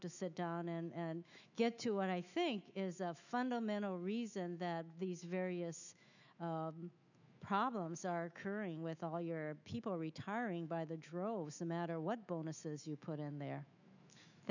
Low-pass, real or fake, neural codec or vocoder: 7.2 kHz; fake; autoencoder, 48 kHz, 128 numbers a frame, DAC-VAE, trained on Japanese speech